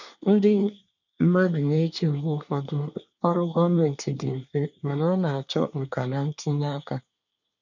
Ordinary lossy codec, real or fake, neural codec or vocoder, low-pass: none; fake; codec, 24 kHz, 1 kbps, SNAC; 7.2 kHz